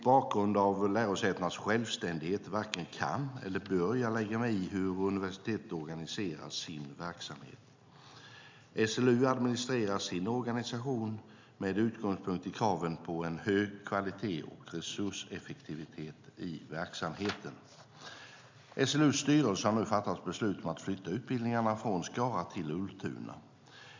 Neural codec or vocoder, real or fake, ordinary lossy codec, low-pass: none; real; MP3, 64 kbps; 7.2 kHz